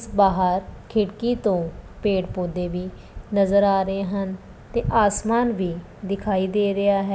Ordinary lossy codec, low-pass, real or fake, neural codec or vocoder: none; none; real; none